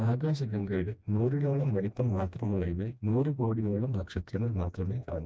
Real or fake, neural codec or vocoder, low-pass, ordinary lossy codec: fake; codec, 16 kHz, 1 kbps, FreqCodec, smaller model; none; none